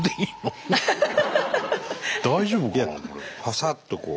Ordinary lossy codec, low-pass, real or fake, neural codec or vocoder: none; none; real; none